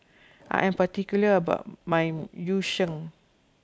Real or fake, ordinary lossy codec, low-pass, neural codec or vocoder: real; none; none; none